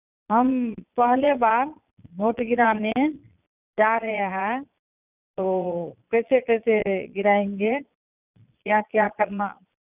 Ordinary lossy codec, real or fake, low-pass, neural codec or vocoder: none; fake; 3.6 kHz; vocoder, 22.05 kHz, 80 mel bands, Vocos